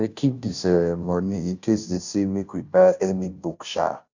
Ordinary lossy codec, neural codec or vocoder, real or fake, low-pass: none; codec, 16 kHz, 0.5 kbps, FunCodec, trained on Chinese and English, 25 frames a second; fake; 7.2 kHz